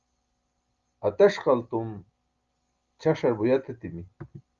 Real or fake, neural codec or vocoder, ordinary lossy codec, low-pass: real; none; Opus, 32 kbps; 7.2 kHz